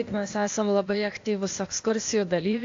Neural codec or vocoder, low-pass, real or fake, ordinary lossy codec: codec, 16 kHz, 0.8 kbps, ZipCodec; 7.2 kHz; fake; AAC, 48 kbps